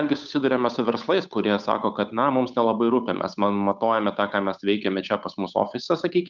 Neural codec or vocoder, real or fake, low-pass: codec, 16 kHz, 8 kbps, FunCodec, trained on Chinese and English, 25 frames a second; fake; 7.2 kHz